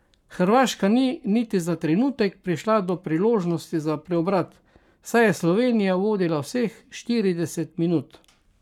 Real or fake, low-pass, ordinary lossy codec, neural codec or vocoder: fake; 19.8 kHz; none; codec, 44.1 kHz, 7.8 kbps, Pupu-Codec